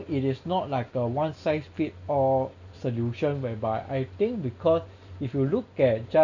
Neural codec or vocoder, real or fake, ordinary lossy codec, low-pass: none; real; none; 7.2 kHz